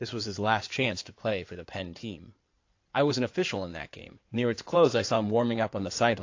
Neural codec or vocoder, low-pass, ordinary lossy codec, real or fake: codec, 16 kHz in and 24 kHz out, 2.2 kbps, FireRedTTS-2 codec; 7.2 kHz; AAC, 48 kbps; fake